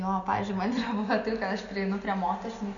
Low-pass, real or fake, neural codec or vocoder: 7.2 kHz; real; none